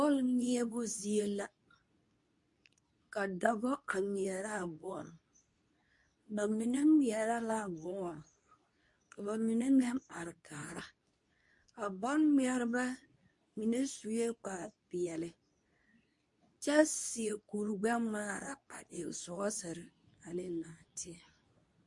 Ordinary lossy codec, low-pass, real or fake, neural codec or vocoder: MP3, 48 kbps; 10.8 kHz; fake; codec, 24 kHz, 0.9 kbps, WavTokenizer, medium speech release version 2